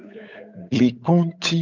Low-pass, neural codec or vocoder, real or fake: 7.2 kHz; codec, 16 kHz, 8 kbps, FunCodec, trained on Chinese and English, 25 frames a second; fake